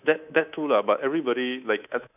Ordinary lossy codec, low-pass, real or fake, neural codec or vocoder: none; 3.6 kHz; fake; codec, 24 kHz, 3.1 kbps, DualCodec